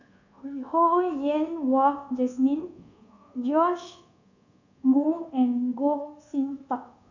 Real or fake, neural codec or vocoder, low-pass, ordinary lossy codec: fake; codec, 24 kHz, 1.2 kbps, DualCodec; 7.2 kHz; none